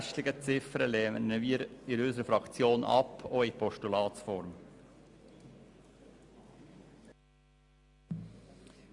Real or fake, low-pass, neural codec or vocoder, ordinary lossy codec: real; 10.8 kHz; none; Opus, 64 kbps